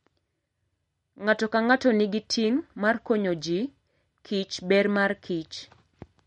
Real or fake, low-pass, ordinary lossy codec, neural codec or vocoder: real; 19.8 kHz; MP3, 48 kbps; none